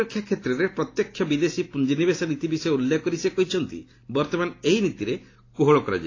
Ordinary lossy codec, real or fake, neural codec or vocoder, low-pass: AAC, 32 kbps; real; none; 7.2 kHz